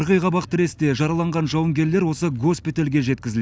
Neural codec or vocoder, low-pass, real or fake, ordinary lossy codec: none; none; real; none